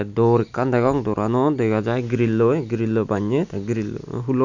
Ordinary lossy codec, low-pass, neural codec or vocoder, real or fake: none; 7.2 kHz; none; real